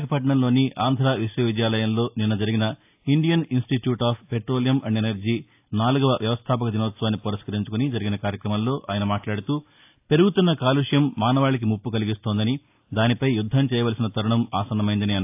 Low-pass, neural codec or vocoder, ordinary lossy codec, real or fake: 3.6 kHz; none; AAC, 32 kbps; real